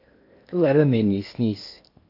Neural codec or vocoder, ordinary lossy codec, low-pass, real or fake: codec, 16 kHz in and 24 kHz out, 0.8 kbps, FocalCodec, streaming, 65536 codes; AAC, 32 kbps; 5.4 kHz; fake